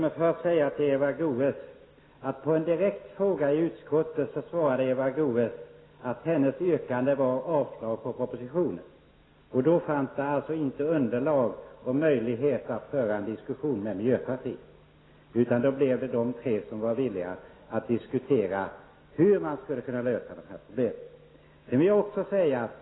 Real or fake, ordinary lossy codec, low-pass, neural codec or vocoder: real; AAC, 16 kbps; 7.2 kHz; none